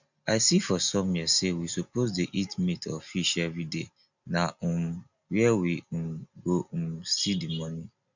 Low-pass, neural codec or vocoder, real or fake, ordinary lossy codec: 7.2 kHz; none; real; none